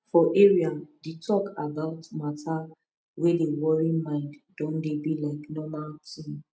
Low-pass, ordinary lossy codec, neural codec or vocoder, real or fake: none; none; none; real